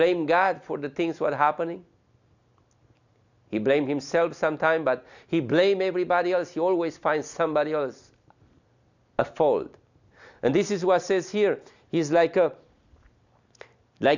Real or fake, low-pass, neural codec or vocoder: real; 7.2 kHz; none